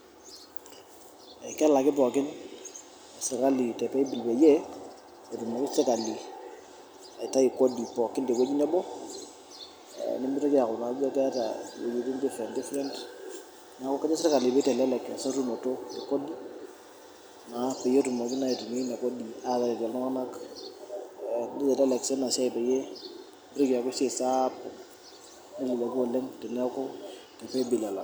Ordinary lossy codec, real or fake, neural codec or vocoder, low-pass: none; real; none; none